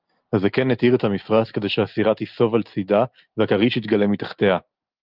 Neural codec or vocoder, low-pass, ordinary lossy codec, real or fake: none; 5.4 kHz; Opus, 24 kbps; real